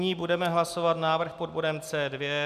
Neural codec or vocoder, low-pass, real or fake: none; 14.4 kHz; real